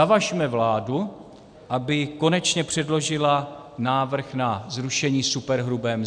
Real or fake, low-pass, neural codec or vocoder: real; 9.9 kHz; none